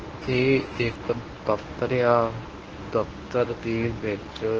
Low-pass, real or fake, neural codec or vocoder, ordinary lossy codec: 7.2 kHz; fake; codec, 24 kHz, 0.9 kbps, WavTokenizer, medium speech release version 1; Opus, 16 kbps